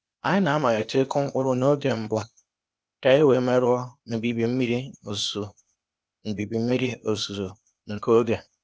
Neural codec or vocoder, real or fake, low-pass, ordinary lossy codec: codec, 16 kHz, 0.8 kbps, ZipCodec; fake; none; none